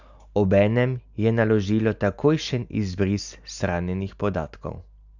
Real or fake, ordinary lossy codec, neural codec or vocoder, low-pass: real; none; none; 7.2 kHz